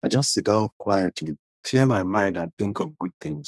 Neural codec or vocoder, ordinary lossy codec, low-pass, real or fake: codec, 24 kHz, 1 kbps, SNAC; none; none; fake